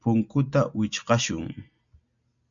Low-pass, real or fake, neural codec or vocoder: 7.2 kHz; real; none